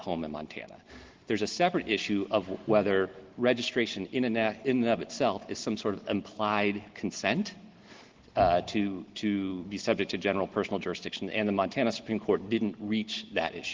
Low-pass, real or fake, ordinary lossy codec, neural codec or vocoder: 7.2 kHz; real; Opus, 16 kbps; none